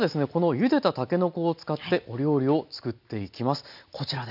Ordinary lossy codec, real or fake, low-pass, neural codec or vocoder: none; real; 5.4 kHz; none